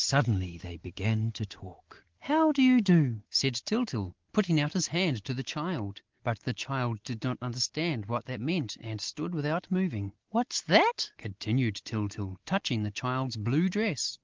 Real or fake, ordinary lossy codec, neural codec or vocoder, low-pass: real; Opus, 16 kbps; none; 7.2 kHz